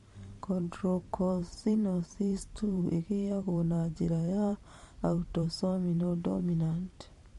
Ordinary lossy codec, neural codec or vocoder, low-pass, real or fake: MP3, 48 kbps; vocoder, 44.1 kHz, 128 mel bands every 512 samples, BigVGAN v2; 14.4 kHz; fake